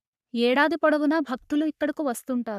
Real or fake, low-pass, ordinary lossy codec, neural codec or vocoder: fake; 14.4 kHz; none; codec, 44.1 kHz, 3.4 kbps, Pupu-Codec